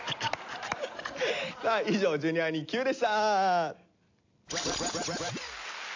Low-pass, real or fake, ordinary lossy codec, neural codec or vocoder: 7.2 kHz; real; AAC, 48 kbps; none